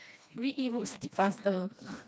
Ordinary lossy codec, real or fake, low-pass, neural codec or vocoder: none; fake; none; codec, 16 kHz, 2 kbps, FreqCodec, smaller model